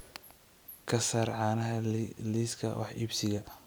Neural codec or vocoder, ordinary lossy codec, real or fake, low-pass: none; none; real; none